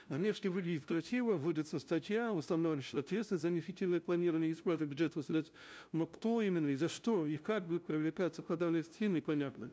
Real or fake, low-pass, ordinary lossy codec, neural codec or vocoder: fake; none; none; codec, 16 kHz, 0.5 kbps, FunCodec, trained on LibriTTS, 25 frames a second